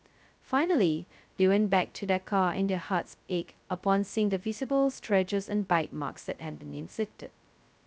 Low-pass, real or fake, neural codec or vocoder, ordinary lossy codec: none; fake; codec, 16 kHz, 0.2 kbps, FocalCodec; none